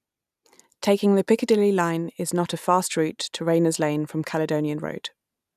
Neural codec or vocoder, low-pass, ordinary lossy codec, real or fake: none; 14.4 kHz; none; real